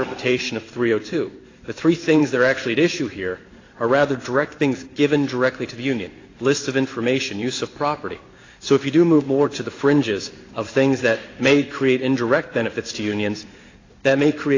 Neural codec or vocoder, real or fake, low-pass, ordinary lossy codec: codec, 16 kHz in and 24 kHz out, 1 kbps, XY-Tokenizer; fake; 7.2 kHz; AAC, 32 kbps